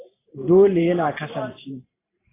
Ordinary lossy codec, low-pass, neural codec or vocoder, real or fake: AAC, 16 kbps; 3.6 kHz; vocoder, 44.1 kHz, 128 mel bands every 512 samples, BigVGAN v2; fake